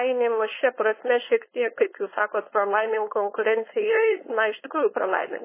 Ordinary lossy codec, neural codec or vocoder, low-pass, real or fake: MP3, 16 kbps; codec, 16 kHz, 4.8 kbps, FACodec; 3.6 kHz; fake